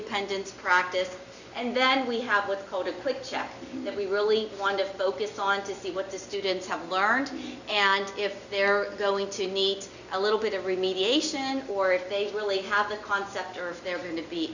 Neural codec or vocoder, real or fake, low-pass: none; real; 7.2 kHz